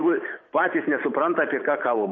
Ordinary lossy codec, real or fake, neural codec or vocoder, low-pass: MP3, 32 kbps; fake; vocoder, 44.1 kHz, 128 mel bands every 512 samples, BigVGAN v2; 7.2 kHz